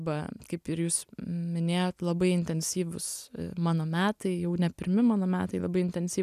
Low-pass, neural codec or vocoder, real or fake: 14.4 kHz; none; real